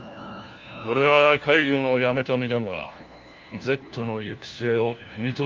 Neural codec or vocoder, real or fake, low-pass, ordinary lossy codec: codec, 16 kHz, 1 kbps, FunCodec, trained on LibriTTS, 50 frames a second; fake; 7.2 kHz; Opus, 32 kbps